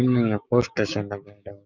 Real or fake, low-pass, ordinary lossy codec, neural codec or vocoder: fake; 7.2 kHz; none; codec, 16 kHz, 6 kbps, DAC